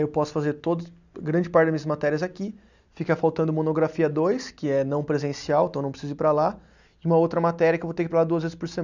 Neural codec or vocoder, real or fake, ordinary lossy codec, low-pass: none; real; none; 7.2 kHz